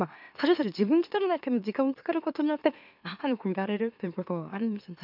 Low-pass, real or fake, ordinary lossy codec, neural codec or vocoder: 5.4 kHz; fake; none; autoencoder, 44.1 kHz, a latent of 192 numbers a frame, MeloTTS